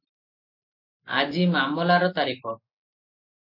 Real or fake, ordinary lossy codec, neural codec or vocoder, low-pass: real; MP3, 32 kbps; none; 5.4 kHz